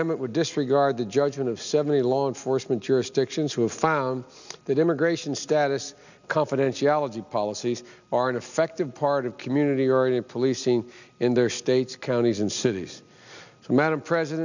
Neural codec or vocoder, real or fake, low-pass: none; real; 7.2 kHz